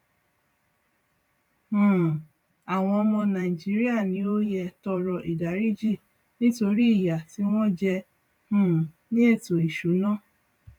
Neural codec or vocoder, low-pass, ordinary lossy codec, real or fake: vocoder, 44.1 kHz, 128 mel bands every 512 samples, BigVGAN v2; 19.8 kHz; none; fake